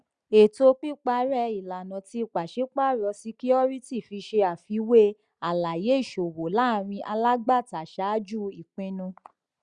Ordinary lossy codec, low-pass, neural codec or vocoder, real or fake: Opus, 64 kbps; 9.9 kHz; none; real